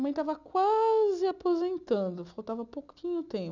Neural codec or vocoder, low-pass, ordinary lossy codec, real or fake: none; 7.2 kHz; none; real